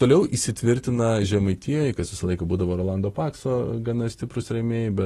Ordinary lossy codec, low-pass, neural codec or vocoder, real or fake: AAC, 32 kbps; 19.8 kHz; none; real